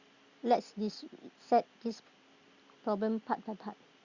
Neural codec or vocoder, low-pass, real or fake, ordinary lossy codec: none; 7.2 kHz; real; Opus, 64 kbps